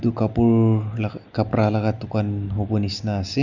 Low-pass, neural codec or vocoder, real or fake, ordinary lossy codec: 7.2 kHz; none; real; none